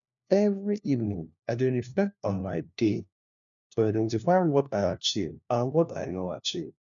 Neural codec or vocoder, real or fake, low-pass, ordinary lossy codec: codec, 16 kHz, 1 kbps, FunCodec, trained on LibriTTS, 50 frames a second; fake; 7.2 kHz; none